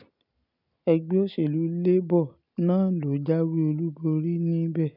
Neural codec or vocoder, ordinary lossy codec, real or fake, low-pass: none; none; real; 5.4 kHz